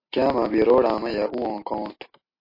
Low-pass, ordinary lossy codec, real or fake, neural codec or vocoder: 5.4 kHz; MP3, 32 kbps; real; none